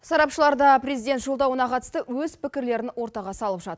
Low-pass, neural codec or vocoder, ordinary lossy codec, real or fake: none; none; none; real